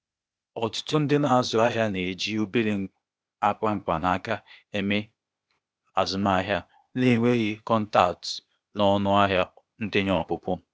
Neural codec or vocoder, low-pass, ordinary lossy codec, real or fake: codec, 16 kHz, 0.8 kbps, ZipCodec; none; none; fake